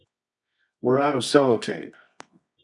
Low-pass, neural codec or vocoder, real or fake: 10.8 kHz; codec, 24 kHz, 0.9 kbps, WavTokenizer, medium music audio release; fake